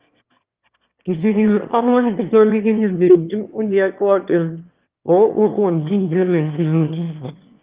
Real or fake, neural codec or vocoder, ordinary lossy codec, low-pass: fake; autoencoder, 22.05 kHz, a latent of 192 numbers a frame, VITS, trained on one speaker; Opus, 24 kbps; 3.6 kHz